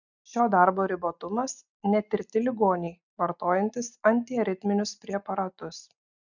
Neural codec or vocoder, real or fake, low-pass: none; real; 7.2 kHz